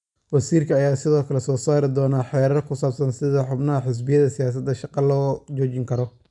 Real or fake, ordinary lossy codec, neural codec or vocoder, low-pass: real; none; none; 10.8 kHz